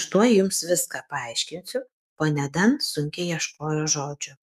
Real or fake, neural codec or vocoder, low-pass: fake; autoencoder, 48 kHz, 128 numbers a frame, DAC-VAE, trained on Japanese speech; 14.4 kHz